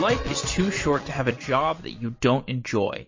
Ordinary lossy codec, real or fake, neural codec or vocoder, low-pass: MP3, 32 kbps; fake; vocoder, 22.05 kHz, 80 mel bands, Vocos; 7.2 kHz